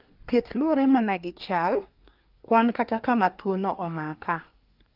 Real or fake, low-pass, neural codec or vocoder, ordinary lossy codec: fake; 5.4 kHz; codec, 24 kHz, 1 kbps, SNAC; Opus, 24 kbps